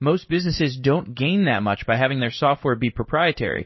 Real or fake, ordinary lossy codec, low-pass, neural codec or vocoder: fake; MP3, 24 kbps; 7.2 kHz; codec, 16 kHz in and 24 kHz out, 1 kbps, XY-Tokenizer